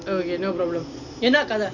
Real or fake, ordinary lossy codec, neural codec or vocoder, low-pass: real; none; none; 7.2 kHz